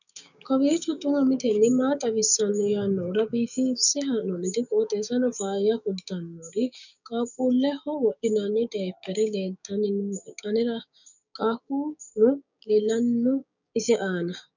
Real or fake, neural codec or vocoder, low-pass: fake; codec, 16 kHz, 6 kbps, DAC; 7.2 kHz